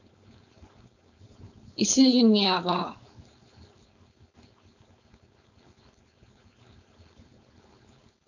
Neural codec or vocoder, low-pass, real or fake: codec, 16 kHz, 4.8 kbps, FACodec; 7.2 kHz; fake